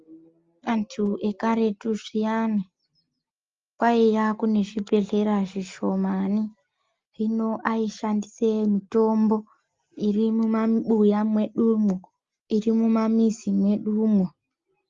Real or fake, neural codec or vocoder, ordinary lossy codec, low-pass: real; none; Opus, 32 kbps; 7.2 kHz